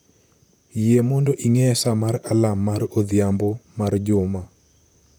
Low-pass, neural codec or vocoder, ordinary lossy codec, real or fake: none; vocoder, 44.1 kHz, 128 mel bands, Pupu-Vocoder; none; fake